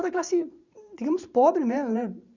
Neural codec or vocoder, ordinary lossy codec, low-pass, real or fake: vocoder, 44.1 kHz, 128 mel bands every 512 samples, BigVGAN v2; Opus, 64 kbps; 7.2 kHz; fake